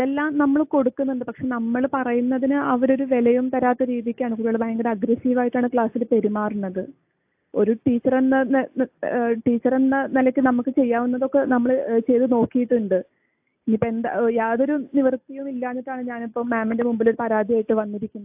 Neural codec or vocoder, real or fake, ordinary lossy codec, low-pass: none; real; AAC, 32 kbps; 3.6 kHz